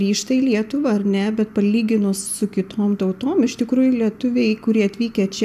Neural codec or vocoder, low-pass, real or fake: none; 14.4 kHz; real